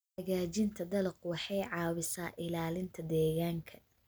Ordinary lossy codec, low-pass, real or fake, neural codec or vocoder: none; none; real; none